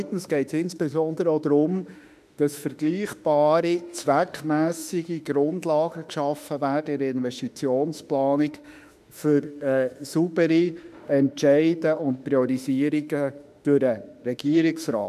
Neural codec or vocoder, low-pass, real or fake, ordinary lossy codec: autoencoder, 48 kHz, 32 numbers a frame, DAC-VAE, trained on Japanese speech; 14.4 kHz; fake; none